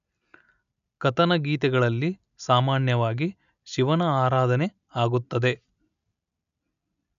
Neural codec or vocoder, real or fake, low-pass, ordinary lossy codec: none; real; 7.2 kHz; none